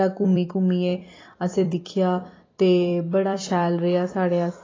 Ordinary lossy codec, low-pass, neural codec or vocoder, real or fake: AAC, 32 kbps; 7.2 kHz; vocoder, 44.1 kHz, 128 mel bands every 256 samples, BigVGAN v2; fake